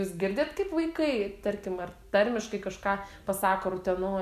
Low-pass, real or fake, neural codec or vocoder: 14.4 kHz; real; none